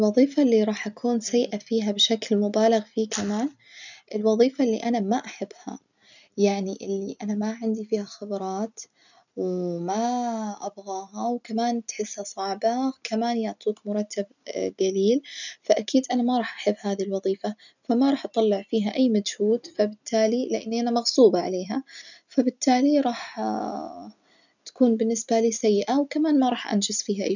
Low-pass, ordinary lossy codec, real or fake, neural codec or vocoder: 7.2 kHz; none; real; none